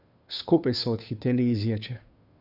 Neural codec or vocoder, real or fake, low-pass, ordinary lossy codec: codec, 16 kHz, 2 kbps, FunCodec, trained on Chinese and English, 25 frames a second; fake; 5.4 kHz; none